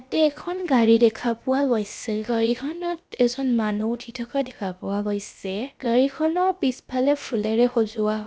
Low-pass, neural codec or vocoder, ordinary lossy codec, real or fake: none; codec, 16 kHz, about 1 kbps, DyCAST, with the encoder's durations; none; fake